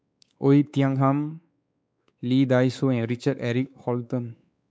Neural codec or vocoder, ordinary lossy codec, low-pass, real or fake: codec, 16 kHz, 4 kbps, X-Codec, WavLM features, trained on Multilingual LibriSpeech; none; none; fake